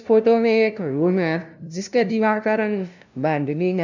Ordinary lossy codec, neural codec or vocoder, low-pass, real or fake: none; codec, 16 kHz, 0.5 kbps, FunCodec, trained on LibriTTS, 25 frames a second; 7.2 kHz; fake